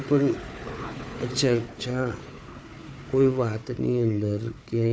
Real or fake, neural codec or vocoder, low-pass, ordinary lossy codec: fake; codec, 16 kHz, 4 kbps, FunCodec, trained on Chinese and English, 50 frames a second; none; none